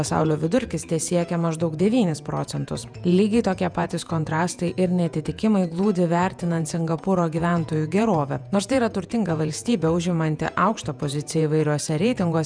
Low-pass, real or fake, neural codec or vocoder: 9.9 kHz; fake; vocoder, 48 kHz, 128 mel bands, Vocos